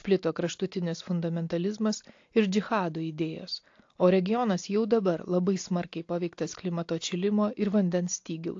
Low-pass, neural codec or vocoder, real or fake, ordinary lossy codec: 7.2 kHz; none; real; AAC, 48 kbps